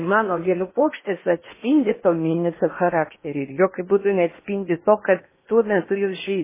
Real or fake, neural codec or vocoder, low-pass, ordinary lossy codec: fake; codec, 16 kHz in and 24 kHz out, 0.8 kbps, FocalCodec, streaming, 65536 codes; 3.6 kHz; MP3, 16 kbps